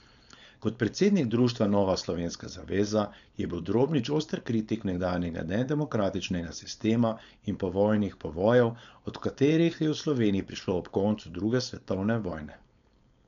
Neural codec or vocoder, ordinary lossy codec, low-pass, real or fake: codec, 16 kHz, 4.8 kbps, FACodec; none; 7.2 kHz; fake